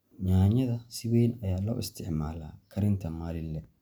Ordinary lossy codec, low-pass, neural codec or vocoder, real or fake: none; none; none; real